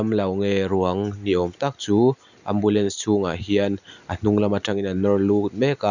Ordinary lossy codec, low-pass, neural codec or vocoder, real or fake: none; 7.2 kHz; none; real